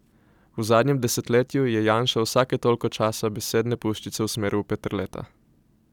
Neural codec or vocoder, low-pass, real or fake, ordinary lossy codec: vocoder, 44.1 kHz, 128 mel bands every 256 samples, BigVGAN v2; 19.8 kHz; fake; none